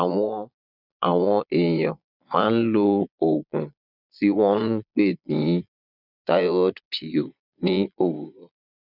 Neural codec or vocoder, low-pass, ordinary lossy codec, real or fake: vocoder, 22.05 kHz, 80 mel bands, Vocos; 5.4 kHz; none; fake